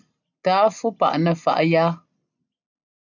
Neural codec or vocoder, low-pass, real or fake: none; 7.2 kHz; real